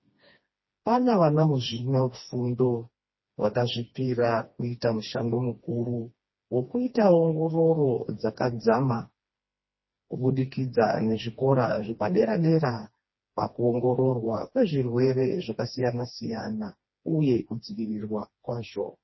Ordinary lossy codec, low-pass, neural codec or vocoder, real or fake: MP3, 24 kbps; 7.2 kHz; codec, 16 kHz, 2 kbps, FreqCodec, smaller model; fake